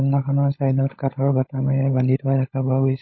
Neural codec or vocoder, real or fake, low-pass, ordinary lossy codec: codec, 16 kHz, 16 kbps, FunCodec, trained on LibriTTS, 50 frames a second; fake; 7.2 kHz; MP3, 24 kbps